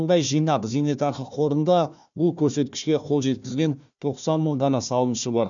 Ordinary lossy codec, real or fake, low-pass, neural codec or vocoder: none; fake; 7.2 kHz; codec, 16 kHz, 1 kbps, FunCodec, trained on Chinese and English, 50 frames a second